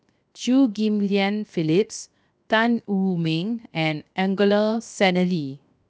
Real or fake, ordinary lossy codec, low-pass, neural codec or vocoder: fake; none; none; codec, 16 kHz, 0.7 kbps, FocalCodec